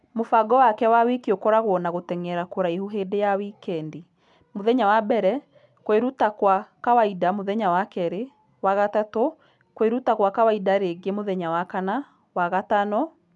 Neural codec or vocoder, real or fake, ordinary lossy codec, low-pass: none; real; MP3, 96 kbps; 10.8 kHz